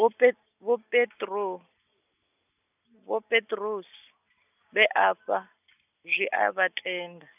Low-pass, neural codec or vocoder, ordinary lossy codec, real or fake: 3.6 kHz; none; none; real